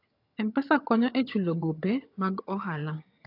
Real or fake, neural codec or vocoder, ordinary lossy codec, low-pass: fake; vocoder, 22.05 kHz, 80 mel bands, HiFi-GAN; AAC, 32 kbps; 5.4 kHz